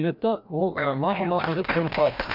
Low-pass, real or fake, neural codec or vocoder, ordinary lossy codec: 5.4 kHz; fake; codec, 16 kHz, 1 kbps, FreqCodec, larger model; MP3, 48 kbps